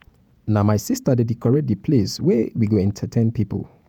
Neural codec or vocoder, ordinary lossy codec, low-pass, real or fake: vocoder, 48 kHz, 128 mel bands, Vocos; none; 19.8 kHz; fake